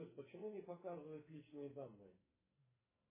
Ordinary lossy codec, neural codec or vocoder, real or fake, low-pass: MP3, 16 kbps; codec, 44.1 kHz, 2.6 kbps, SNAC; fake; 3.6 kHz